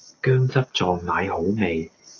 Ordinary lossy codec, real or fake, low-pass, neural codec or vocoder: AAC, 32 kbps; real; 7.2 kHz; none